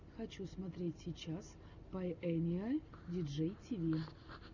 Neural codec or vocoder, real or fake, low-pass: none; real; 7.2 kHz